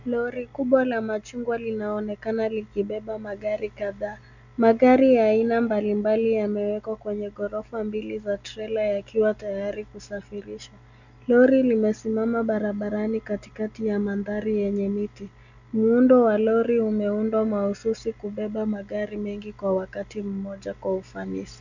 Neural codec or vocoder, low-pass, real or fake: none; 7.2 kHz; real